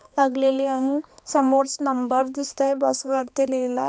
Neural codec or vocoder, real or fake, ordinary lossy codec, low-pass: codec, 16 kHz, 2 kbps, X-Codec, HuBERT features, trained on balanced general audio; fake; none; none